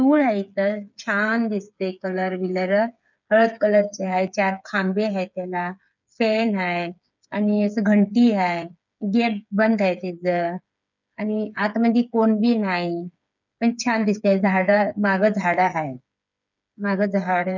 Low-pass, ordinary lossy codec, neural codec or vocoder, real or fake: 7.2 kHz; none; codec, 16 kHz, 8 kbps, FreqCodec, smaller model; fake